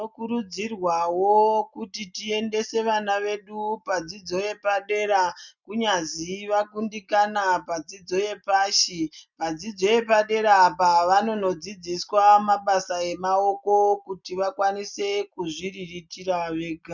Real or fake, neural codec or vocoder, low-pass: real; none; 7.2 kHz